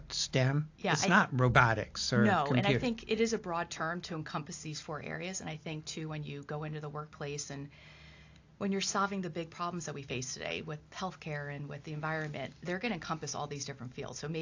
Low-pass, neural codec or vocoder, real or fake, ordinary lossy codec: 7.2 kHz; none; real; AAC, 48 kbps